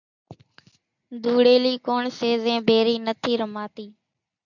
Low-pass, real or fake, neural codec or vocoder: 7.2 kHz; real; none